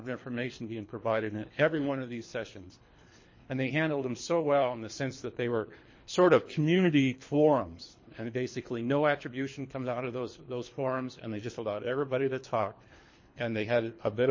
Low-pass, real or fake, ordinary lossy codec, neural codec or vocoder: 7.2 kHz; fake; MP3, 32 kbps; codec, 24 kHz, 3 kbps, HILCodec